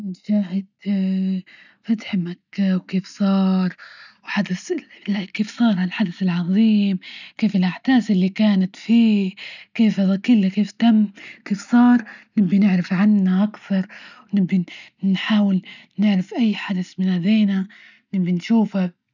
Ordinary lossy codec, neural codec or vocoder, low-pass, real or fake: none; none; 7.2 kHz; real